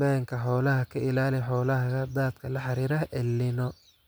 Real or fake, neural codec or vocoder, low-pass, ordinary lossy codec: real; none; none; none